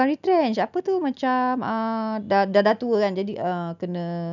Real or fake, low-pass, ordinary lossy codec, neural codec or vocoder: real; 7.2 kHz; none; none